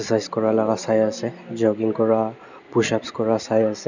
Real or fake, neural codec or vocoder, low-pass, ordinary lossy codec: real; none; 7.2 kHz; none